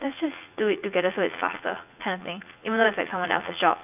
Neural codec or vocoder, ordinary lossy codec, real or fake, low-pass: vocoder, 44.1 kHz, 80 mel bands, Vocos; AAC, 32 kbps; fake; 3.6 kHz